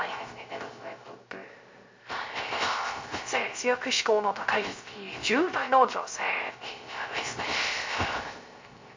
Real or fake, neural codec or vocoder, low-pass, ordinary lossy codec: fake; codec, 16 kHz, 0.3 kbps, FocalCodec; 7.2 kHz; MP3, 48 kbps